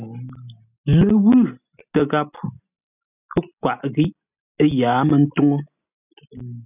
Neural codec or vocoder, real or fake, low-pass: none; real; 3.6 kHz